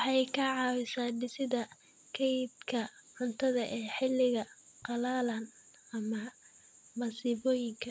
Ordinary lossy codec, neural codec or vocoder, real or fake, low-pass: none; none; real; none